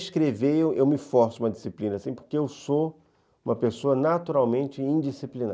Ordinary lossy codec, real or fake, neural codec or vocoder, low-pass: none; real; none; none